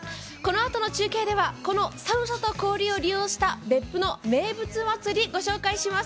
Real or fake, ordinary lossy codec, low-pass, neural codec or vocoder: real; none; none; none